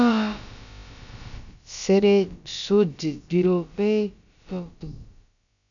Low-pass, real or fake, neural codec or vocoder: 7.2 kHz; fake; codec, 16 kHz, about 1 kbps, DyCAST, with the encoder's durations